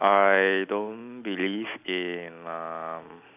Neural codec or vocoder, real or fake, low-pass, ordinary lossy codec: none; real; 3.6 kHz; none